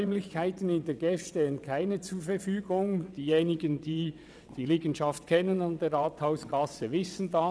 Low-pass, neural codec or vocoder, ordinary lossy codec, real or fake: none; vocoder, 22.05 kHz, 80 mel bands, WaveNeXt; none; fake